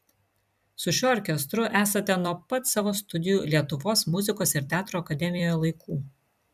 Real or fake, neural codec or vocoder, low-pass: fake; vocoder, 44.1 kHz, 128 mel bands every 256 samples, BigVGAN v2; 14.4 kHz